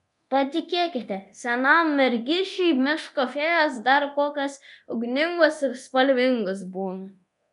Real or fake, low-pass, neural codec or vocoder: fake; 10.8 kHz; codec, 24 kHz, 0.9 kbps, DualCodec